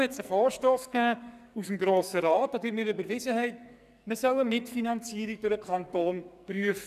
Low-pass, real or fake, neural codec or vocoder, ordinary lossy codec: 14.4 kHz; fake; codec, 44.1 kHz, 2.6 kbps, SNAC; none